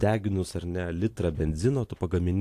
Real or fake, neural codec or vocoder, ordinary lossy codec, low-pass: real; none; AAC, 64 kbps; 14.4 kHz